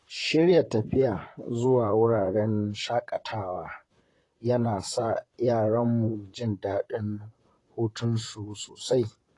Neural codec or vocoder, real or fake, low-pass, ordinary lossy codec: vocoder, 44.1 kHz, 128 mel bands, Pupu-Vocoder; fake; 10.8 kHz; AAC, 32 kbps